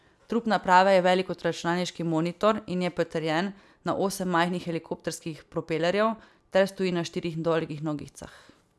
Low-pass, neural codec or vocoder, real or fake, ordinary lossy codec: none; none; real; none